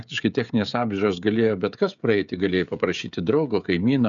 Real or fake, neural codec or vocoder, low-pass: fake; codec, 16 kHz, 16 kbps, FreqCodec, smaller model; 7.2 kHz